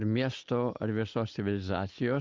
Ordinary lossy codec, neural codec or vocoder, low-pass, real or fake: Opus, 24 kbps; none; 7.2 kHz; real